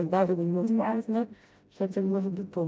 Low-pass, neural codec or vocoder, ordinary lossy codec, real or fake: none; codec, 16 kHz, 0.5 kbps, FreqCodec, smaller model; none; fake